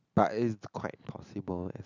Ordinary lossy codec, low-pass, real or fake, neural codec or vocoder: none; 7.2 kHz; real; none